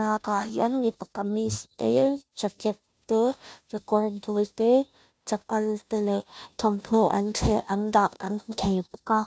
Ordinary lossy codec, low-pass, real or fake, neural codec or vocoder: none; none; fake; codec, 16 kHz, 0.5 kbps, FunCodec, trained on Chinese and English, 25 frames a second